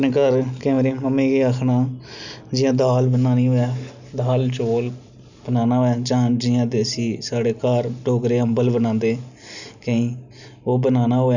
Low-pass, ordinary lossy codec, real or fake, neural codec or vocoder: 7.2 kHz; none; real; none